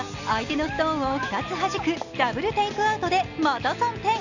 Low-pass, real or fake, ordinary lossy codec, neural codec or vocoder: 7.2 kHz; real; none; none